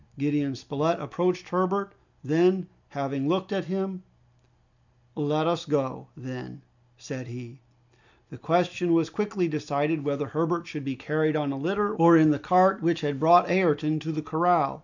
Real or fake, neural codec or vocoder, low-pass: real; none; 7.2 kHz